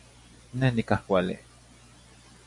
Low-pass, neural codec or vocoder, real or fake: 10.8 kHz; none; real